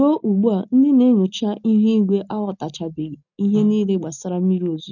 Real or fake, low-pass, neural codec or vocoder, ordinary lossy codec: real; 7.2 kHz; none; none